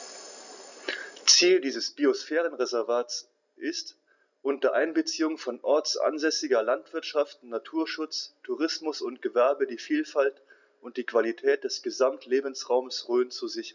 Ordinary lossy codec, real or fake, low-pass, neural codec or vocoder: none; real; 7.2 kHz; none